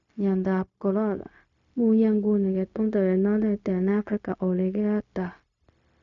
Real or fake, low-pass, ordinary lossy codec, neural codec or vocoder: fake; 7.2 kHz; none; codec, 16 kHz, 0.4 kbps, LongCat-Audio-Codec